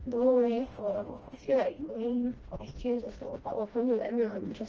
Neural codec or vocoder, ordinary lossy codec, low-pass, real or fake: codec, 16 kHz, 1 kbps, FreqCodec, smaller model; Opus, 24 kbps; 7.2 kHz; fake